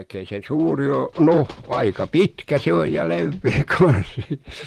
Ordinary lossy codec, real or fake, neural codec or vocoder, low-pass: Opus, 24 kbps; fake; vocoder, 44.1 kHz, 128 mel bands, Pupu-Vocoder; 14.4 kHz